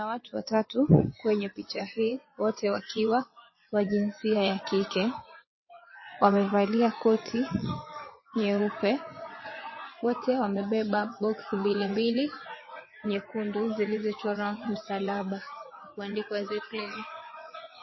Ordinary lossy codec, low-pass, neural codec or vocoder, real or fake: MP3, 24 kbps; 7.2 kHz; vocoder, 22.05 kHz, 80 mel bands, WaveNeXt; fake